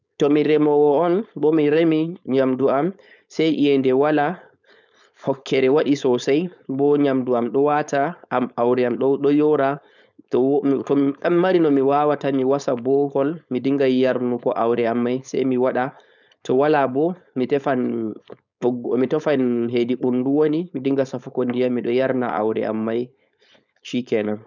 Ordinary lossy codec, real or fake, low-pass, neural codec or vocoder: none; fake; 7.2 kHz; codec, 16 kHz, 4.8 kbps, FACodec